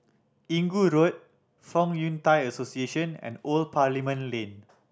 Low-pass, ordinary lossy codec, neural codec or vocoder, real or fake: none; none; none; real